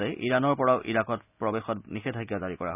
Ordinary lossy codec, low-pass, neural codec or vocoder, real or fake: none; 3.6 kHz; none; real